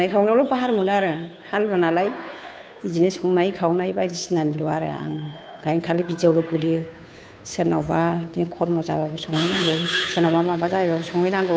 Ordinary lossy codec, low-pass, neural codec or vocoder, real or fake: none; none; codec, 16 kHz, 2 kbps, FunCodec, trained on Chinese and English, 25 frames a second; fake